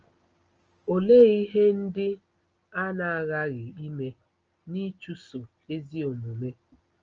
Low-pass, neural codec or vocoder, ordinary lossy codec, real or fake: 7.2 kHz; none; Opus, 24 kbps; real